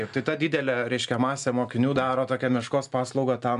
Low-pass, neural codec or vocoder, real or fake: 10.8 kHz; none; real